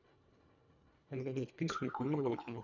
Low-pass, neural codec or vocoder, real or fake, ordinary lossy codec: 7.2 kHz; codec, 24 kHz, 1.5 kbps, HILCodec; fake; none